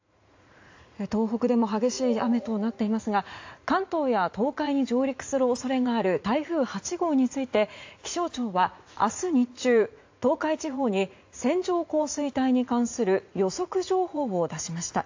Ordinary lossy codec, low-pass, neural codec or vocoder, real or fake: AAC, 48 kbps; 7.2 kHz; vocoder, 44.1 kHz, 80 mel bands, Vocos; fake